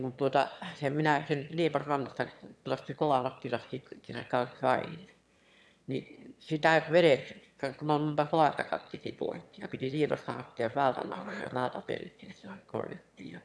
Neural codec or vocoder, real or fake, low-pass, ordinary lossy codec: autoencoder, 22.05 kHz, a latent of 192 numbers a frame, VITS, trained on one speaker; fake; none; none